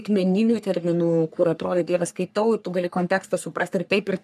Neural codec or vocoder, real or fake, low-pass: codec, 44.1 kHz, 3.4 kbps, Pupu-Codec; fake; 14.4 kHz